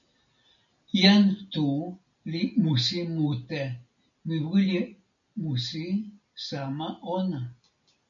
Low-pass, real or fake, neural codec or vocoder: 7.2 kHz; real; none